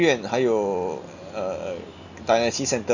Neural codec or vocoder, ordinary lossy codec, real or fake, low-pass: none; none; real; 7.2 kHz